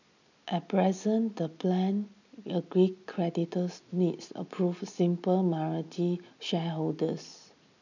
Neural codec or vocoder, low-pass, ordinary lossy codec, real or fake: none; 7.2 kHz; none; real